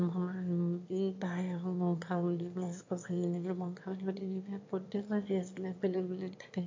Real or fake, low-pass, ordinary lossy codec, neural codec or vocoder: fake; 7.2 kHz; MP3, 48 kbps; autoencoder, 22.05 kHz, a latent of 192 numbers a frame, VITS, trained on one speaker